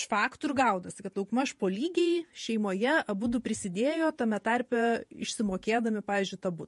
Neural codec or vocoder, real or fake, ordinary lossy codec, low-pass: vocoder, 48 kHz, 128 mel bands, Vocos; fake; MP3, 48 kbps; 14.4 kHz